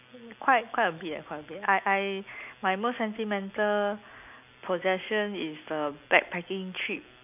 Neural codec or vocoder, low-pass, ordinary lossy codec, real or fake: none; 3.6 kHz; none; real